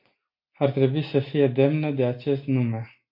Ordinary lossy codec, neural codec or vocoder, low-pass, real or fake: MP3, 32 kbps; none; 5.4 kHz; real